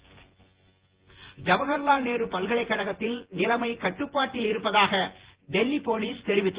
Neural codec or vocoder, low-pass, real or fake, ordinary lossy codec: vocoder, 24 kHz, 100 mel bands, Vocos; 3.6 kHz; fake; Opus, 16 kbps